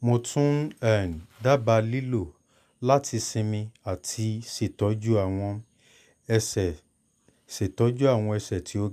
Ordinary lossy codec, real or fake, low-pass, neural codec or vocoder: none; real; 14.4 kHz; none